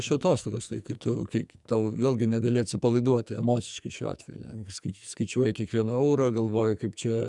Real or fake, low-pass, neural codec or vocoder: fake; 10.8 kHz; codec, 44.1 kHz, 2.6 kbps, SNAC